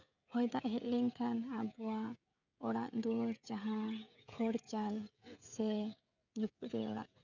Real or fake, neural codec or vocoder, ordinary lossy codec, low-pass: fake; codec, 16 kHz, 16 kbps, FreqCodec, smaller model; none; 7.2 kHz